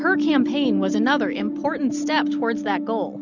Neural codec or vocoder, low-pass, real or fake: none; 7.2 kHz; real